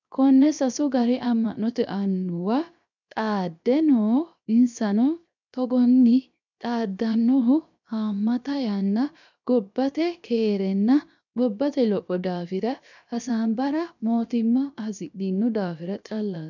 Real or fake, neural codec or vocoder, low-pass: fake; codec, 16 kHz, 0.7 kbps, FocalCodec; 7.2 kHz